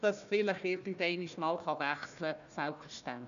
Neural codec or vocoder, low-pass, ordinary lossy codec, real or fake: codec, 16 kHz, 1 kbps, FunCodec, trained on Chinese and English, 50 frames a second; 7.2 kHz; none; fake